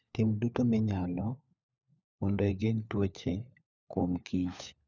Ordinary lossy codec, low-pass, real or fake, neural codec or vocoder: none; 7.2 kHz; fake; codec, 16 kHz, 4 kbps, FunCodec, trained on LibriTTS, 50 frames a second